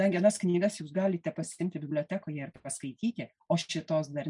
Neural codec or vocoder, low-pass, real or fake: none; 10.8 kHz; real